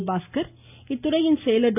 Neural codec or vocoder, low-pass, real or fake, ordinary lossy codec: none; 3.6 kHz; real; none